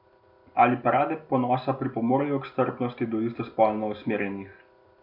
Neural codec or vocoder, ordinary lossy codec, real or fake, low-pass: none; none; real; 5.4 kHz